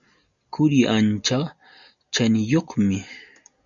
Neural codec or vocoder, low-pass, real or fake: none; 7.2 kHz; real